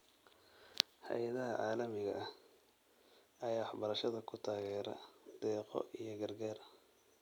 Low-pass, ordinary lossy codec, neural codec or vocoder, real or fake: none; none; none; real